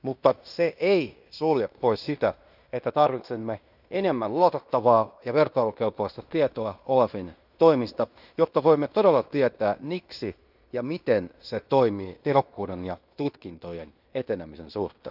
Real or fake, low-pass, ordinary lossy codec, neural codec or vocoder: fake; 5.4 kHz; none; codec, 16 kHz in and 24 kHz out, 0.9 kbps, LongCat-Audio-Codec, fine tuned four codebook decoder